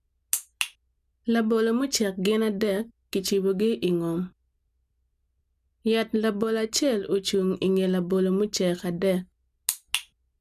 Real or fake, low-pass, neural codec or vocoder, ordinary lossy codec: real; 14.4 kHz; none; none